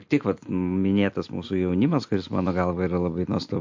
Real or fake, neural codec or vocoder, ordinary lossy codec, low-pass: real; none; MP3, 48 kbps; 7.2 kHz